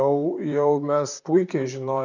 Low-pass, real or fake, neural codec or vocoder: 7.2 kHz; fake; vocoder, 44.1 kHz, 128 mel bands, Pupu-Vocoder